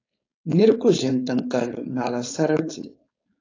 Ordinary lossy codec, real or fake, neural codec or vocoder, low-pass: AAC, 32 kbps; fake; codec, 16 kHz, 4.8 kbps, FACodec; 7.2 kHz